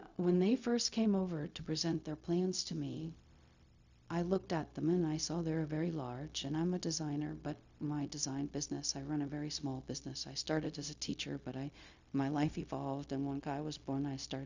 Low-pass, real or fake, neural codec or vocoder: 7.2 kHz; fake; codec, 16 kHz, 0.4 kbps, LongCat-Audio-Codec